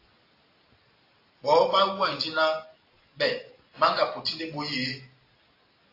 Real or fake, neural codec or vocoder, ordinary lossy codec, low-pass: real; none; AAC, 32 kbps; 5.4 kHz